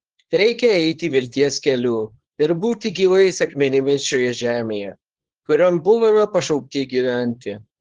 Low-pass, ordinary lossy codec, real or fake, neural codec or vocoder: 10.8 kHz; Opus, 16 kbps; fake; codec, 24 kHz, 0.9 kbps, WavTokenizer, small release